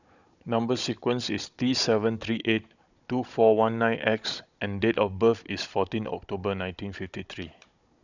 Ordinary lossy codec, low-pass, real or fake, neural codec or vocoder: none; 7.2 kHz; fake; codec, 16 kHz, 16 kbps, FunCodec, trained on Chinese and English, 50 frames a second